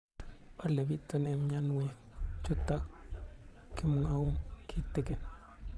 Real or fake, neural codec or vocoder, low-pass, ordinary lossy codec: fake; vocoder, 22.05 kHz, 80 mel bands, Vocos; none; none